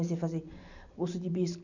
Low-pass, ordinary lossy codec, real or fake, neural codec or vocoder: 7.2 kHz; none; real; none